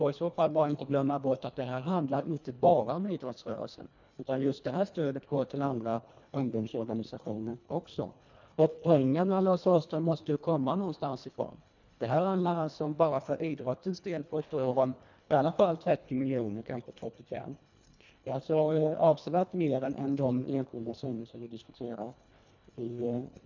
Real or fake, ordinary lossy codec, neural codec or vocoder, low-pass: fake; none; codec, 24 kHz, 1.5 kbps, HILCodec; 7.2 kHz